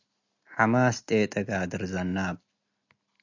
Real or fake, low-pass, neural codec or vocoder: real; 7.2 kHz; none